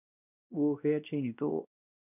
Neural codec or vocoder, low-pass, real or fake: codec, 16 kHz, 1 kbps, X-Codec, WavLM features, trained on Multilingual LibriSpeech; 3.6 kHz; fake